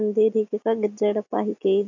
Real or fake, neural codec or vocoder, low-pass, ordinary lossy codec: real; none; 7.2 kHz; none